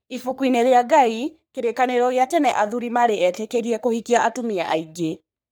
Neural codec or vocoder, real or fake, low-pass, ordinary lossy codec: codec, 44.1 kHz, 3.4 kbps, Pupu-Codec; fake; none; none